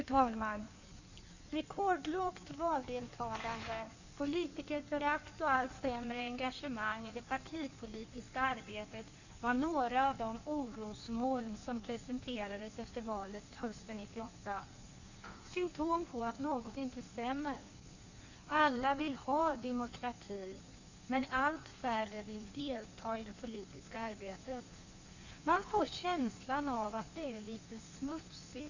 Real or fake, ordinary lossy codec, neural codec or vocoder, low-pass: fake; none; codec, 16 kHz in and 24 kHz out, 1.1 kbps, FireRedTTS-2 codec; 7.2 kHz